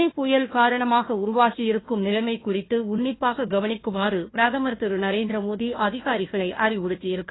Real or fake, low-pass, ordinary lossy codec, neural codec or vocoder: fake; 7.2 kHz; AAC, 16 kbps; codec, 16 kHz, 1 kbps, FunCodec, trained on Chinese and English, 50 frames a second